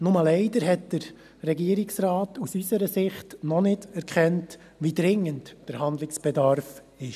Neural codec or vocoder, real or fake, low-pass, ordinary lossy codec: none; real; 14.4 kHz; none